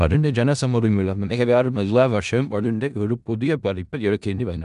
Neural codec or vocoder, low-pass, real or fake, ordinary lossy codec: codec, 16 kHz in and 24 kHz out, 0.4 kbps, LongCat-Audio-Codec, four codebook decoder; 10.8 kHz; fake; none